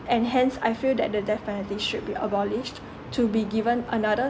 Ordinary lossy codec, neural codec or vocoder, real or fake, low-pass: none; none; real; none